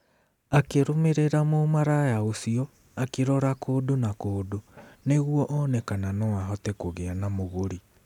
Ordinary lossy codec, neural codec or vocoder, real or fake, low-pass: none; none; real; 19.8 kHz